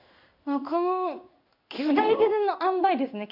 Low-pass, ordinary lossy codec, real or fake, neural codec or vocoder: 5.4 kHz; none; fake; codec, 16 kHz in and 24 kHz out, 1 kbps, XY-Tokenizer